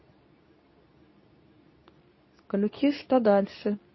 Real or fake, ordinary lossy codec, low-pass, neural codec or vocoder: fake; MP3, 24 kbps; 7.2 kHz; codec, 24 kHz, 0.9 kbps, WavTokenizer, medium speech release version 2